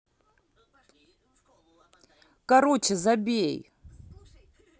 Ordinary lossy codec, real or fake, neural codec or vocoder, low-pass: none; real; none; none